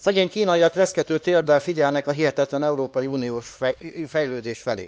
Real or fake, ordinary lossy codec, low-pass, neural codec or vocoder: fake; none; none; codec, 16 kHz, 2 kbps, X-Codec, HuBERT features, trained on LibriSpeech